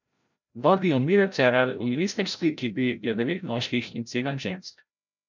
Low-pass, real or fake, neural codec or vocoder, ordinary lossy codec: 7.2 kHz; fake; codec, 16 kHz, 0.5 kbps, FreqCodec, larger model; none